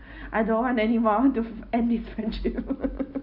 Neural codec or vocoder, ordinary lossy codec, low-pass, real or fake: none; none; 5.4 kHz; real